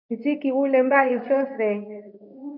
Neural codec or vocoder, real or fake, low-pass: codec, 24 kHz, 0.9 kbps, WavTokenizer, medium speech release version 2; fake; 5.4 kHz